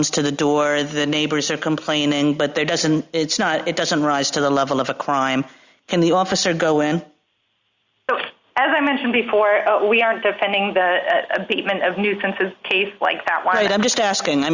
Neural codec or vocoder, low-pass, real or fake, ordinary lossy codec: none; 7.2 kHz; real; Opus, 64 kbps